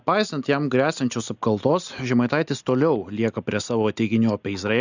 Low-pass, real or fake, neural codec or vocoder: 7.2 kHz; real; none